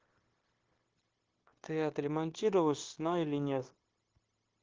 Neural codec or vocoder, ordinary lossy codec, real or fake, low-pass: codec, 16 kHz, 0.9 kbps, LongCat-Audio-Codec; Opus, 16 kbps; fake; 7.2 kHz